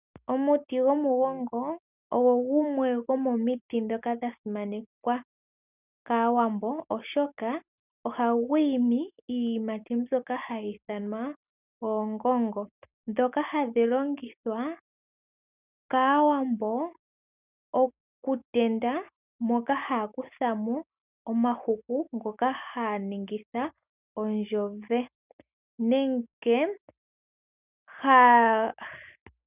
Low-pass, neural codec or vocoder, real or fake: 3.6 kHz; none; real